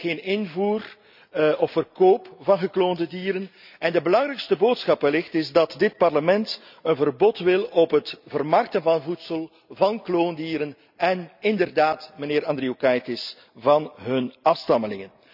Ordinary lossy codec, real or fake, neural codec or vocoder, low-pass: none; real; none; 5.4 kHz